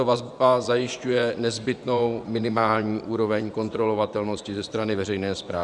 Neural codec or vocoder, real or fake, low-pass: vocoder, 24 kHz, 100 mel bands, Vocos; fake; 10.8 kHz